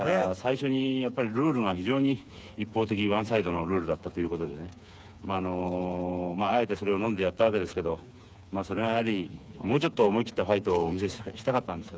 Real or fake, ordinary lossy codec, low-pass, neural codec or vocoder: fake; none; none; codec, 16 kHz, 4 kbps, FreqCodec, smaller model